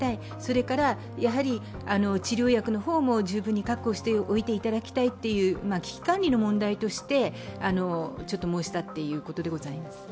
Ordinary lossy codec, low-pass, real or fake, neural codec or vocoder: none; none; real; none